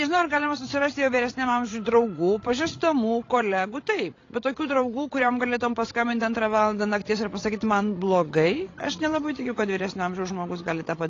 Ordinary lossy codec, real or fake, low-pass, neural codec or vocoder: AAC, 32 kbps; fake; 7.2 kHz; codec, 16 kHz, 16 kbps, FreqCodec, larger model